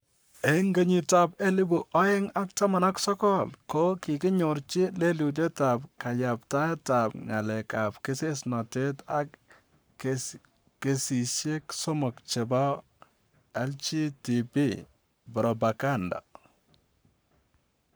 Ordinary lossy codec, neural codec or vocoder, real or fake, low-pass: none; codec, 44.1 kHz, 7.8 kbps, Pupu-Codec; fake; none